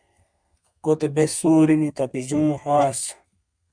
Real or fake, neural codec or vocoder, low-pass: fake; codec, 32 kHz, 1.9 kbps, SNAC; 9.9 kHz